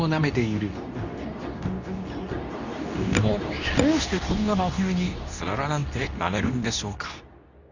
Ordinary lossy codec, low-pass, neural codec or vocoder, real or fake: none; 7.2 kHz; codec, 24 kHz, 0.9 kbps, WavTokenizer, medium speech release version 2; fake